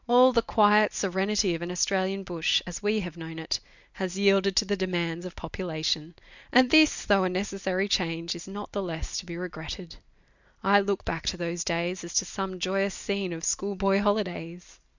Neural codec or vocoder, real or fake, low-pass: none; real; 7.2 kHz